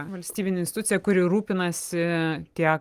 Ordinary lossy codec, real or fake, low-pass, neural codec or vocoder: Opus, 32 kbps; real; 14.4 kHz; none